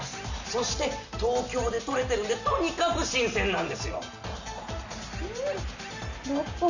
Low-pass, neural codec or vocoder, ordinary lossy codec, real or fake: 7.2 kHz; none; none; real